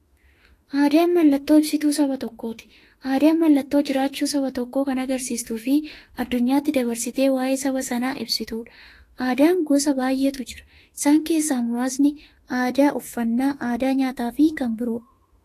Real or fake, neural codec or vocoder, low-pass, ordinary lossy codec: fake; autoencoder, 48 kHz, 32 numbers a frame, DAC-VAE, trained on Japanese speech; 14.4 kHz; AAC, 48 kbps